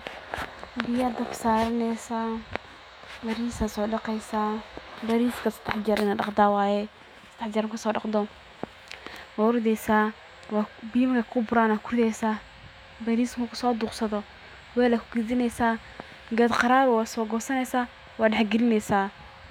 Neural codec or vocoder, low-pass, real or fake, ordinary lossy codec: autoencoder, 48 kHz, 128 numbers a frame, DAC-VAE, trained on Japanese speech; 19.8 kHz; fake; none